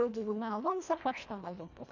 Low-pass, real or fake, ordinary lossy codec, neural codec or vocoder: 7.2 kHz; fake; none; codec, 24 kHz, 1.5 kbps, HILCodec